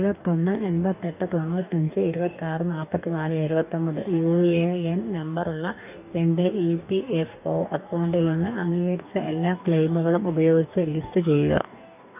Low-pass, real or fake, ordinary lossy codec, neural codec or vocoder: 3.6 kHz; fake; none; codec, 44.1 kHz, 2.6 kbps, DAC